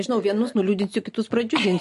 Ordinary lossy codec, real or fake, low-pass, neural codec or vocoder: MP3, 48 kbps; real; 14.4 kHz; none